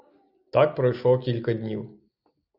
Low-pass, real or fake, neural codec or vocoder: 5.4 kHz; real; none